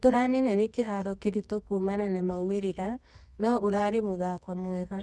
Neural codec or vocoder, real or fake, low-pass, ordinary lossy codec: codec, 24 kHz, 0.9 kbps, WavTokenizer, medium music audio release; fake; none; none